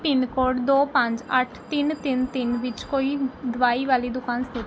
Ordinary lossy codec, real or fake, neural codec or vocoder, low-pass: none; real; none; none